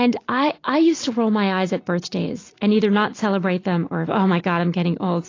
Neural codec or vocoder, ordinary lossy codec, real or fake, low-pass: none; AAC, 32 kbps; real; 7.2 kHz